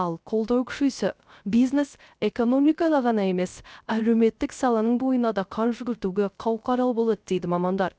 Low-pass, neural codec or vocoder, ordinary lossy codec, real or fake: none; codec, 16 kHz, 0.3 kbps, FocalCodec; none; fake